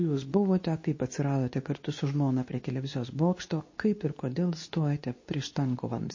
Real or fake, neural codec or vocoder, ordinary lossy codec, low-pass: fake; codec, 24 kHz, 0.9 kbps, WavTokenizer, medium speech release version 2; MP3, 32 kbps; 7.2 kHz